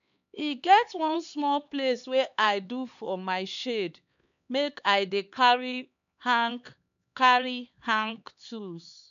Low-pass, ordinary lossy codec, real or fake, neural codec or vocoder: 7.2 kHz; none; fake; codec, 16 kHz, 4 kbps, X-Codec, HuBERT features, trained on LibriSpeech